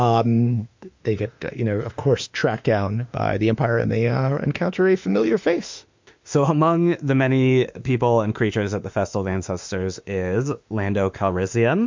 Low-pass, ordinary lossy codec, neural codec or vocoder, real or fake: 7.2 kHz; MP3, 64 kbps; autoencoder, 48 kHz, 32 numbers a frame, DAC-VAE, trained on Japanese speech; fake